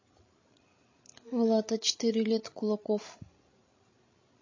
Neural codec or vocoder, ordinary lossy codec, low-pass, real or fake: codec, 16 kHz, 16 kbps, FreqCodec, larger model; MP3, 32 kbps; 7.2 kHz; fake